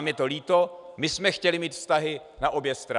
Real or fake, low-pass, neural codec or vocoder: real; 10.8 kHz; none